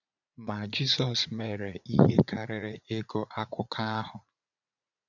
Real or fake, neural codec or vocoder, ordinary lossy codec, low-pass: fake; vocoder, 44.1 kHz, 80 mel bands, Vocos; none; 7.2 kHz